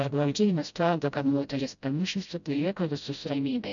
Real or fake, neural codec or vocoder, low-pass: fake; codec, 16 kHz, 0.5 kbps, FreqCodec, smaller model; 7.2 kHz